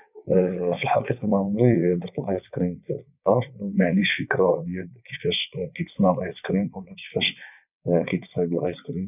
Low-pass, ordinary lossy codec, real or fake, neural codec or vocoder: 3.6 kHz; none; fake; vocoder, 22.05 kHz, 80 mel bands, WaveNeXt